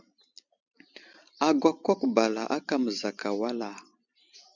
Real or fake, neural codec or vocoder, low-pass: fake; vocoder, 44.1 kHz, 128 mel bands every 256 samples, BigVGAN v2; 7.2 kHz